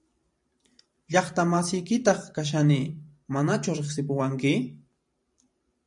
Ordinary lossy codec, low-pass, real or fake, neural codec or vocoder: MP3, 64 kbps; 10.8 kHz; fake; vocoder, 44.1 kHz, 128 mel bands every 512 samples, BigVGAN v2